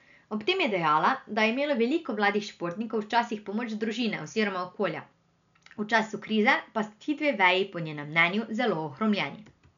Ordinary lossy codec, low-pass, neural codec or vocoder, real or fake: none; 7.2 kHz; none; real